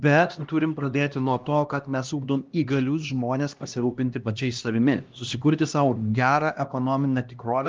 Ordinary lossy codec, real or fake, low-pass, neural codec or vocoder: Opus, 32 kbps; fake; 7.2 kHz; codec, 16 kHz, 1 kbps, X-Codec, HuBERT features, trained on LibriSpeech